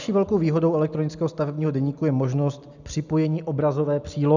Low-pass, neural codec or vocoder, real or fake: 7.2 kHz; none; real